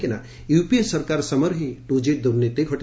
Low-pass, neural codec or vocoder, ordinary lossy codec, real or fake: none; none; none; real